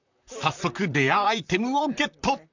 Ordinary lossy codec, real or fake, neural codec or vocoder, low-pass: none; fake; vocoder, 44.1 kHz, 128 mel bands, Pupu-Vocoder; 7.2 kHz